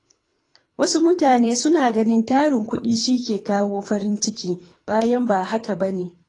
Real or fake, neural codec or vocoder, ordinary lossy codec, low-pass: fake; codec, 24 kHz, 3 kbps, HILCodec; AAC, 32 kbps; 10.8 kHz